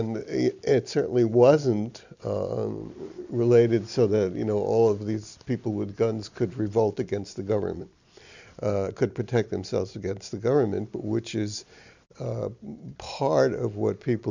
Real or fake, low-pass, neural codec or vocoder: real; 7.2 kHz; none